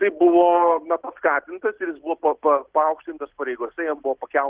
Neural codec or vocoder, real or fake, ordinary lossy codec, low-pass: none; real; Opus, 16 kbps; 3.6 kHz